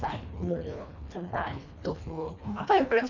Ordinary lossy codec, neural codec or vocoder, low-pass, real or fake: none; codec, 24 kHz, 1.5 kbps, HILCodec; 7.2 kHz; fake